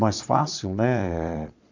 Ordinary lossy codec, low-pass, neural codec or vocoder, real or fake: Opus, 64 kbps; 7.2 kHz; vocoder, 22.05 kHz, 80 mel bands, Vocos; fake